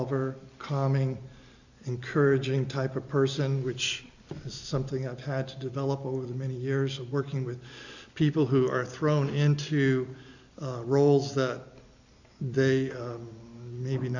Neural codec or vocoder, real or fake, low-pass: none; real; 7.2 kHz